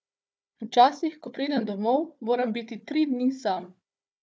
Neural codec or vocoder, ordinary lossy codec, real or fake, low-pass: codec, 16 kHz, 4 kbps, FunCodec, trained on Chinese and English, 50 frames a second; none; fake; none